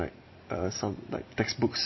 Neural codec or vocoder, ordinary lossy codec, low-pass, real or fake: vocoder, 44.1 kHz, 128 mel bands every 256 samples, BigVGAN v2; MP3, 24 kbps; 7.2 kHz; fake